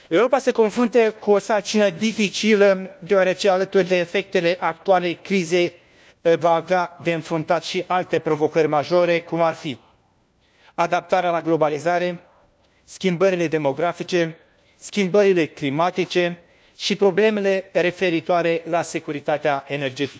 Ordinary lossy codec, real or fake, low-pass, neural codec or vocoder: none; fake; none; codec, 16 kHz, 1 kbps, FunCodec, trained on LibriTTS, 50 frames a second